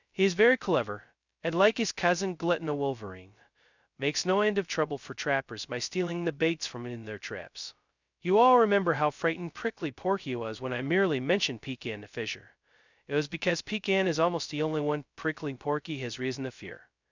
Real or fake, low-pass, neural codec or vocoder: fake; 7.2 kHz; codec, 16 kHz, 0.2 kbps, FocalCodec